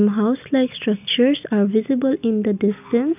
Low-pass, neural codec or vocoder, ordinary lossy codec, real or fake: 3.6 kHz; none; none; real